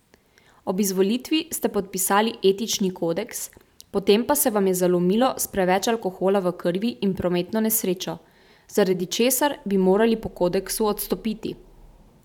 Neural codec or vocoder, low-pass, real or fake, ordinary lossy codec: vocoder, 44.1 kHz, 128 mel bands every 512 samples, BigVGAN v2; 19.8 kHz; fake; none